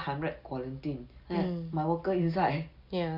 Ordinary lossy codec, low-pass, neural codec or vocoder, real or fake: Opus, 64 kbps; 5.4 kHz; none; real